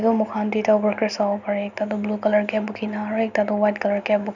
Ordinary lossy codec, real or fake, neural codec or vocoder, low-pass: none; real; none; 7.2 kHz